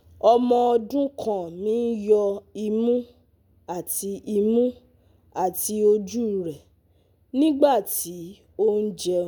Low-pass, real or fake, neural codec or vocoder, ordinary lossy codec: none; real; none; none